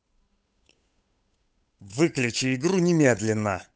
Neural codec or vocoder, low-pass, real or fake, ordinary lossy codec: codec, 16 kHz, 8 kbps, FunCodec, trained on Chinese and English, 25 frames a second; none; fake; none